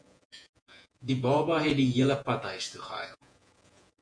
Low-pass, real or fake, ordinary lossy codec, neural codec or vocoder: 9.9 kHz; fake; MP3, 48 kbps; vocoder, 48 kHz, 128 mel bands, Vocos